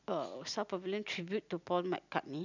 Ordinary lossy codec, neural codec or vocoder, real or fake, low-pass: none; none; real; 7.2 kHz